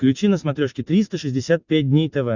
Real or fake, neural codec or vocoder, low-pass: real; none; 7.2 kHz